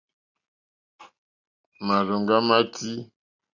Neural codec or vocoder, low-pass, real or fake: none; 7.2 kHz; real